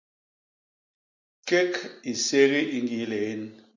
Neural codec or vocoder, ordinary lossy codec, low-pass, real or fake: none; MP3, 64 kbps; 7.2 kHz; real